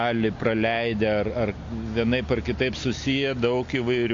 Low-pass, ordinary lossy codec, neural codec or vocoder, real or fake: 7.2 kHz; AAC, 48 kbps; none; real